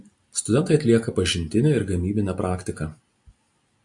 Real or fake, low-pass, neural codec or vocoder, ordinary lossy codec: real; 10.8 kHz; none; AAC, 64 kbps